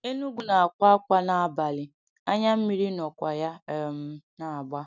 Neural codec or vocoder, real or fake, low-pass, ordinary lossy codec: none; real; 7.2 kHz; none